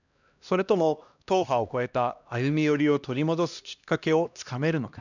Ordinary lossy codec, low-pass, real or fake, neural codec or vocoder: none; 7.2 kHz; fake; codec, 16 kHz, 1 kbps, X-Codec, HuBERT features, trained on LibriSpeech